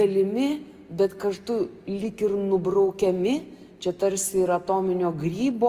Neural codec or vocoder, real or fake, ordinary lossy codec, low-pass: none; real; Opus, 32 kbps; 14.4 kHz